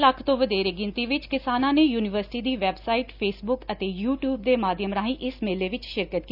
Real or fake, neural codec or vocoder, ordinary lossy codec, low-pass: real; none; none; 5.4 kHz